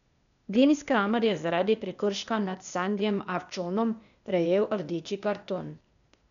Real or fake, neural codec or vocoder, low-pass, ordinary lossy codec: fake; codec, 16 kHz, 0.8 kbps, ZipCodec; 7.2 kHz; none